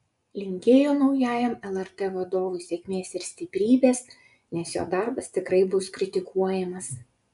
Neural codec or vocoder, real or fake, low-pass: vocoder, 24 kHz, 100 mel bands, Vocos; fake; 10.8 kHz